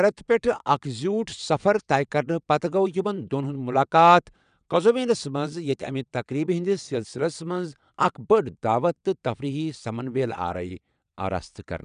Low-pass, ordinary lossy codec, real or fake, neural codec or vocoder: 9.9 kHz; none; fake; vocoder, 22.05 kHz, 80 mel bands, WaveNeXt